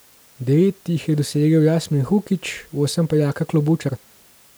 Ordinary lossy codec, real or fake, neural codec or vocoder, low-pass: none; real; none; none